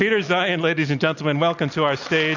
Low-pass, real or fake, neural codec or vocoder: 7.2 kHz; real; none